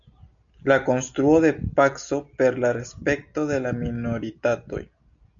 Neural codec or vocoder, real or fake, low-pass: none; real; 7.2 kHz